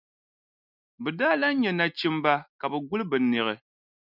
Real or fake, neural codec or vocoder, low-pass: real; none; 5.4 kHz